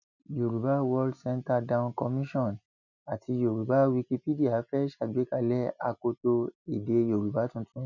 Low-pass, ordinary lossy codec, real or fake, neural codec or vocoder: 7.2 kHz; none; real; none